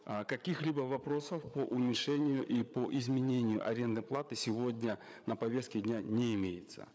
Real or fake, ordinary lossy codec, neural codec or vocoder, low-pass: fake; none; codec, 16 kHz, 8 kbps, FreqCodec, larger model; none